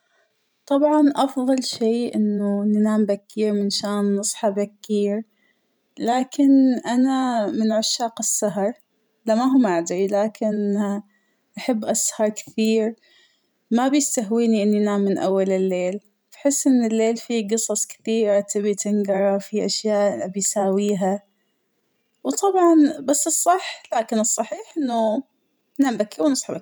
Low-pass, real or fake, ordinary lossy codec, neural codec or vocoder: none; fake; none; vocoder, 44.1 kHz, 128 mel bands every 512 samples, BigVGAN v2